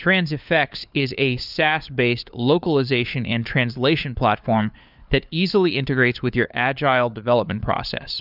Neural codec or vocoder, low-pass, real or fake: codec, 16 kHz, 4 kbps, FunCodec, trained on LibriTTS, 50 frames a second; 5.4 kHz; fake